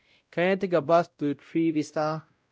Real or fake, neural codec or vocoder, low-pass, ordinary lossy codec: fake; codec, 16 kHz, 0.5 kbps, X-Codec, WavLM features, trained on Multilingual LibriSpeech; none; none